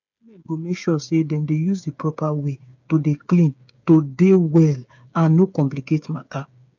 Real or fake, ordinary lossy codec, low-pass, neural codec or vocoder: fake; none; 7.2 kHz; codec, 16 kHz, 8 kbps, FreqCodec, smaller model